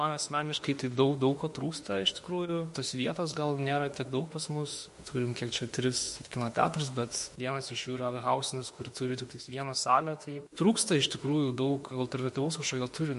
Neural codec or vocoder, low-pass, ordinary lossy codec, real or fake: autoencoder, 48 kHz, 32 numbers a frame, DAC-VAE, trained on Japanese speech; 14.4 kHz; MP3, 48 kbps; fake